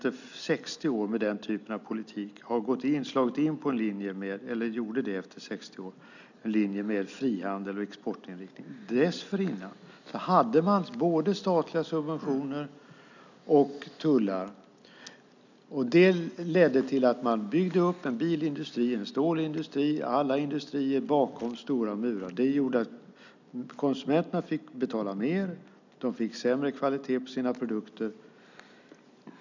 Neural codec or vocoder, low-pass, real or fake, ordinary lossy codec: none; 7.2 kHz; real; none